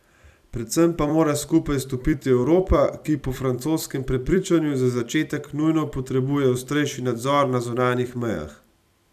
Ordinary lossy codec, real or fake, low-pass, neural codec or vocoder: none; fake; 14.4 kHz; vocoder, 44.1 kHz, 128 mel bands every 256 samples, BigVGAN v2